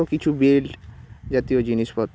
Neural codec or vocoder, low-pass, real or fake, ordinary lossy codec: none; none; real; none